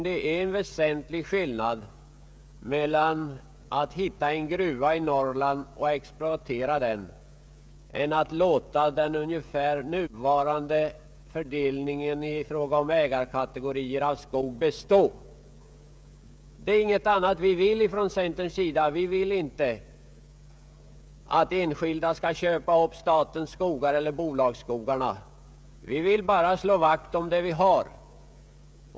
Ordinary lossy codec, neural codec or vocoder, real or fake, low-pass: none; codec, 16 kHz, 16 kbps, FreqCodec, smaller model; fake; none